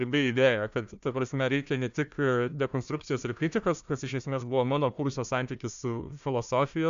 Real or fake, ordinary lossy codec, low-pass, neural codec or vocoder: fake; MP3, 64 kbps; 7.2 kHz; codec, 16 kHz, 1 kbps, FunCodec, trained on Chinese and English, 50 frames a second